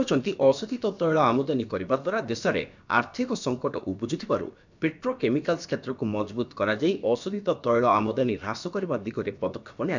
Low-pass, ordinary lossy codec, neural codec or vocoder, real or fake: 7.2 kHz; none; codec, 16 kHz, about 1 kbps, DyCAST, with the encoder's durations; fake